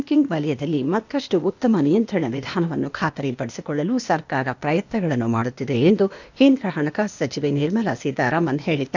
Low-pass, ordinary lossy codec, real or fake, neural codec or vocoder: 7.2 kHz; none; fake; codec, 16 kHz, 0.8 kbps, ZipCodec